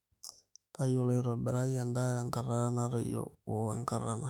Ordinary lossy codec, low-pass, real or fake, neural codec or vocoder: none; 19.8 kHz; fake; autoencoder, 48 kHz, 32 numbers a frame, DAC-VAE, trained on Japanese speech